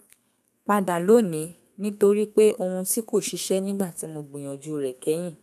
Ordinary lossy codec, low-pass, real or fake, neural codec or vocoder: none; 14.4 kHz; fake; codec, 32 kHz, 1.9 kbps, SNAC